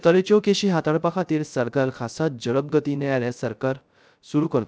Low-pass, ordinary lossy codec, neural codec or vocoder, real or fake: none; none; codec, 16 kHz, 0.3 kbps, FocalCodec; fake